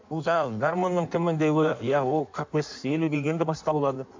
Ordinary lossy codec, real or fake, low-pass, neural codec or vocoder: MP3, 64 kbps; fake; 7.2 kHz; codec, 16 kHz in and 24 kHz out, 1.1 kbps, FireRedTTS-2 codec